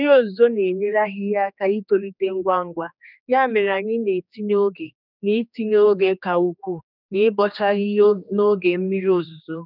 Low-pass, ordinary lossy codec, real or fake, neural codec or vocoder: 5.4 kHz; none; fake; codec, 16 kHz, 2 kbps, X-Codec, HuBERT features, trained on general audio